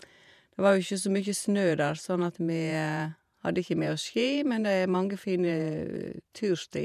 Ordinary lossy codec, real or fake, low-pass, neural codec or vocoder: MP3, 96 kbps; fake; 14.4 kHz; vocoder, 48 kHz, 128 mel bands, Vocos